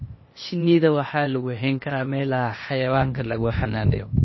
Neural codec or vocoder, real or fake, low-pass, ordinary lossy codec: codec, 16 kHz, 0.8 kbps, ZipCodec; fake; 7.2 kHz; MP3, 24 kbps